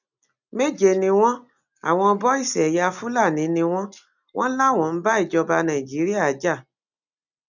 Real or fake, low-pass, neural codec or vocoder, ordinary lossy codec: real; 7.2 kHz; none; none